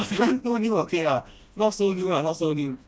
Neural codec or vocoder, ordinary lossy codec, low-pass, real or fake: codec, 16 kHz, 1 kbps, FreqCodec, smaller model; none; none; fake